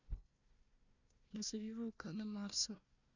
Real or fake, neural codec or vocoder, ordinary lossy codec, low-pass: fake; codec, 16 kHz, 1 kbps, FunCodec, trained on Chinese and English, 50 frames a second; none; 7.2 kHz